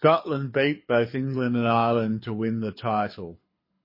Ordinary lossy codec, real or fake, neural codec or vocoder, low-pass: MP3, 24 kbps; fake; codec, 24 kHz, 6 kbps, HILCodec; 5.4 kHz